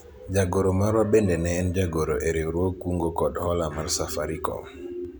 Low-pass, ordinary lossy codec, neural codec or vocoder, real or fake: none; none; none; real